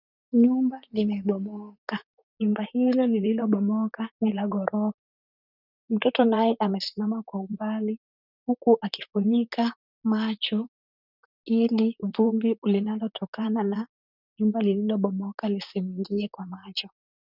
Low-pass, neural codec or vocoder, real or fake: 5.4 kHz; vocoder, 44.1 kHz, 128 mel bands, Pupu-Vocoder; fake